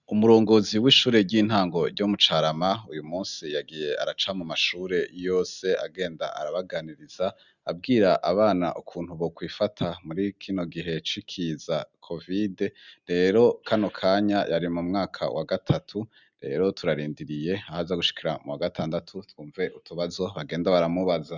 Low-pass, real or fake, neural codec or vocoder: 7.2 kHz; real; none